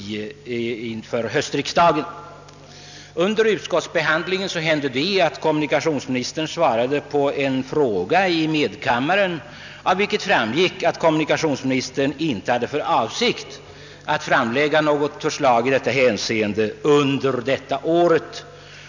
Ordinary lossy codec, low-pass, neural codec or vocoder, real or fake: none; 7.2 kHz; none; real